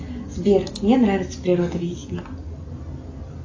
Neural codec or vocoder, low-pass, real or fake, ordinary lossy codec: none; 7.2 kHz; real; AAC, 48 kbps